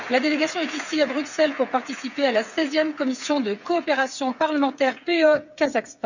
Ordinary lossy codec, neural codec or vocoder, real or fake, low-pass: none; codec, 16 kHz, 8 kbps, FreqCodec, smaller model; fake; 7.2 kHz